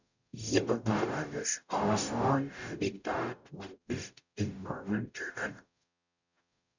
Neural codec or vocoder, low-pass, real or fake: codec, 44.1 kHz, 0.9 kbps, DAC; 7.2 kHz; fake